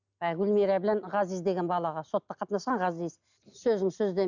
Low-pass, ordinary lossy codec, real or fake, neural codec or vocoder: 7.2 kHz; none; real; none